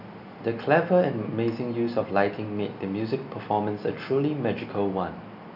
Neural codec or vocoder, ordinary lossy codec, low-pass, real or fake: none; AAC, 48 kbps; 5.4 kHz; real